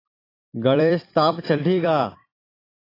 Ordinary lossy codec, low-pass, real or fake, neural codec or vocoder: AAC, 24 kbps; 5.4 kHz; fake; vocoder, 44.1 kHz, 128 mel bands every 256 samples, BigVGAN v2